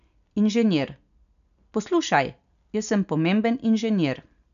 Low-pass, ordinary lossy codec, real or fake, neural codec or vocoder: 7.2 kHz; none; real; none